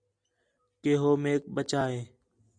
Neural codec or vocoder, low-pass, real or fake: none; 9.9 kHz; real